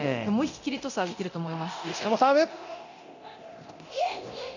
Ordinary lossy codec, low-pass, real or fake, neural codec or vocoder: none; 7.2 kHz; fake; codec, 24 kHz, 0.9 kbps, DualCodec